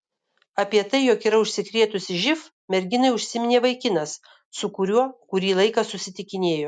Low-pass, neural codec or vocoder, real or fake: 9.9 kHz; none; real